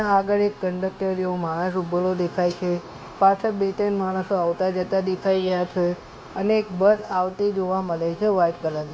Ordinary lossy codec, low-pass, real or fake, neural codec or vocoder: none; none; fake; codec, 16 kHz, 0.9 kbps, LongCat-Audio-Codec